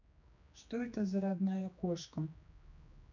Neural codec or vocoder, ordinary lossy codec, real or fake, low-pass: codec, 16 kHz, 2 kbps, X-Codec, HuBERT features, trained on general audio; none; fake; 7.2 kHz